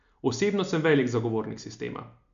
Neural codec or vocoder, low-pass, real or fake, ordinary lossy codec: none; 7.2 kHz; real; none